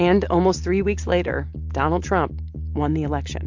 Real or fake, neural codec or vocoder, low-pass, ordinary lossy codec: real; none; 7.2 kHz; MP3, 48 kbps